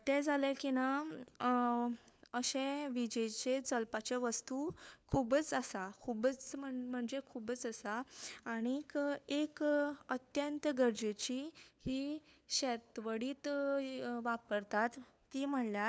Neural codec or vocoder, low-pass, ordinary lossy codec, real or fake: codec, 16 kHz, 4 kbps, FunCodec, trained on LibriTTS, 50 frames a second; none; none; fake